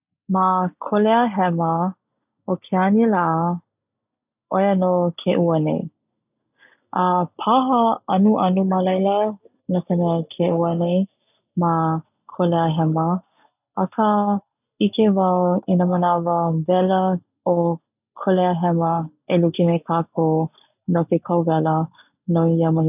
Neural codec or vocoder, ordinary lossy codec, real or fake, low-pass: none; none; real; 3.6 kHz